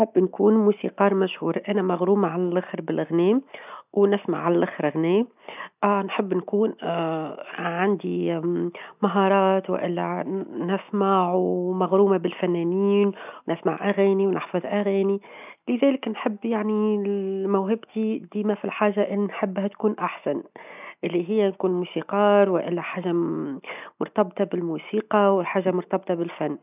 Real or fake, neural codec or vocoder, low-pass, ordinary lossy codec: real; none; 3.6 kHz; none